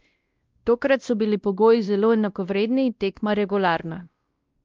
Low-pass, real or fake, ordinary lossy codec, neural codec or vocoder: 7.2 kHz; fake; Opus, 32 kbps; codec, 16 kHz, 1 kbps, X-Codec, WavLM features, trained on Multilingual LibriSpeech